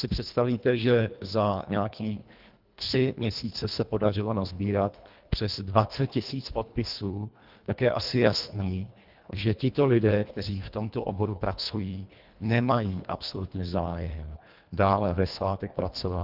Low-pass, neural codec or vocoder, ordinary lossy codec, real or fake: 5.4 kHz; codec, 24 kHz, 1.5 kbps, HILCodec; Opus, 24 kbps; fake